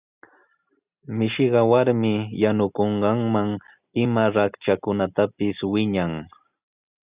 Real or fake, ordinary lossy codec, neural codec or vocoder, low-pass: real; Opus, 64 kbps; none; 3.6 kHz